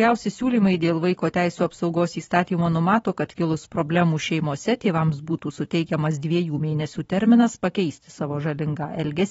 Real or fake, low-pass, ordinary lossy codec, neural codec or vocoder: fake; 19.8 kHz; AAC, 24 kbps; vocoder, 44.1 kHz, 128 mel bands every 512 samples, BigVGAN v2